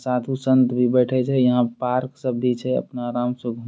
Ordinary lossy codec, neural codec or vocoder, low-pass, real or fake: none; none; none; real